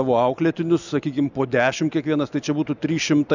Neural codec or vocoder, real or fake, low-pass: none; real; 7.2 kHz